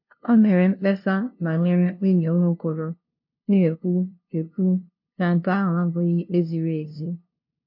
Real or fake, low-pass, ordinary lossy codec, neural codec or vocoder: fake; 5.4 kHz; MP3, 32 kbps; codec, 16 kHz, 0.5 kbps, FunCodec, trained on LibriTTS, 25 frames a second